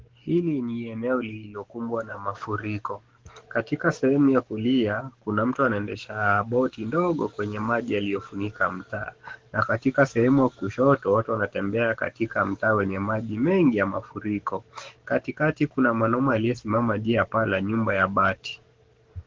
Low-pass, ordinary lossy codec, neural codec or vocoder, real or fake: 7.2 kHz; Opus, 16 kbps; codec, 44.1 kHz, 7.8 kbps, DAC; fake